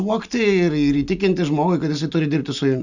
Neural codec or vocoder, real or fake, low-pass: none; real; 7.2 kHz